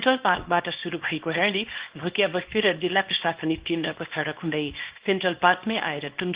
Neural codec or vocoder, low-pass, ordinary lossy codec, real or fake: codec, 24 kHz, 0.9 kbps, WavTokenizer, small release; 3.6 kHz; Opus, 16 kbps; fake